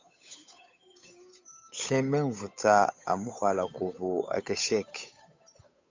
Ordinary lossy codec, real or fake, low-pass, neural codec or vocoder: MP3, 64 kbps; fake; 7.2 kHz; codec, 16 kHz, 8 kbps, FunCodec, trained on Chinese and English, 25 frames a second